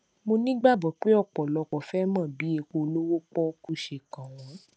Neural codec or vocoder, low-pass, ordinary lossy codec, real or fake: none; none; none; real